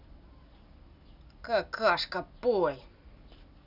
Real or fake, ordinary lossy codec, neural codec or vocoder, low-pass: real; none; none; 5.4 kHz